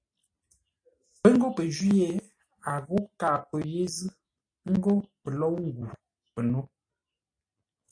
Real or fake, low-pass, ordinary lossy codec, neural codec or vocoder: real; 9.9 kHz; AAC, 48 kbps; none